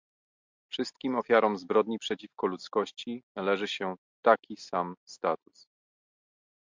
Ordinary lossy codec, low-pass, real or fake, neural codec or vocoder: MP3, 64 kbps; 7.2 kHz; real; none